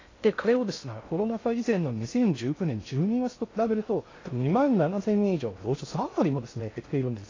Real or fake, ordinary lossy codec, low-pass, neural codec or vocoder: fake; AAC, 32 kbps; 7.2 kHz; codec, 16 kHz in and 24 kHz out, 0.6 kbps, FocalCodec, streaming, 2048 codes